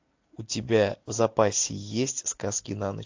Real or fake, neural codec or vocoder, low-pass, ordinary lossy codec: real; none; 7.2 kHz; AAC, 48 kbps